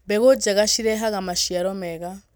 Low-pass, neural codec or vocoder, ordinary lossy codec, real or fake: none; none; none; real